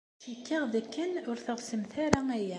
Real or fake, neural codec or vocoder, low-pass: real; none; 10.8 kHz